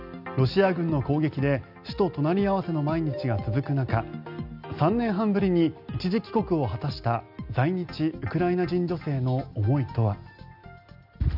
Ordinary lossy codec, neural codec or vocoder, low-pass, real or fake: none; none; 5.4 kHz; real